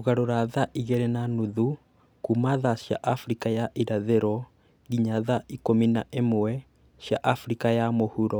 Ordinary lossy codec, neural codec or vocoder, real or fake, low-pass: none; none; real; none